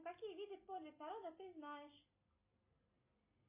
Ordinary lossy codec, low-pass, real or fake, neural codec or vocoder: MP3, 24 kbps; 3.6 kHz; real; none